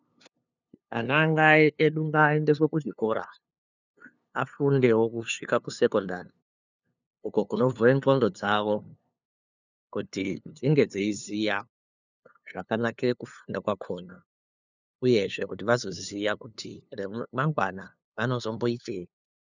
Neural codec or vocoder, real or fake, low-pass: codec, 16 kHz, 2 kbps, FunCodec, trained on LibriTTS, 25 frames a second; fake; 7.2 kHz